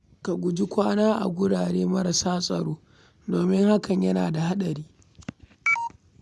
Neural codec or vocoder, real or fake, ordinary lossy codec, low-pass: none; real; none; none